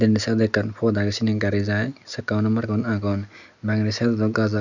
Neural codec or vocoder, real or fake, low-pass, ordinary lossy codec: none; real; 7.2 kHz; none